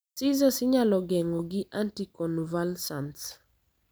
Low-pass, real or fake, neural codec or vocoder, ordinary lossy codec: none; real; none; none